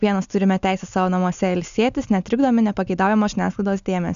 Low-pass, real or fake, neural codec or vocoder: 7.2 kHz; real; none